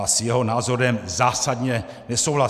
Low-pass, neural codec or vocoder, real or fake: 14.4 kHz; none; real